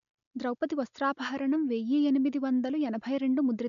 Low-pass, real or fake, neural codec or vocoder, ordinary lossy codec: 7.2 kHz; real; none; none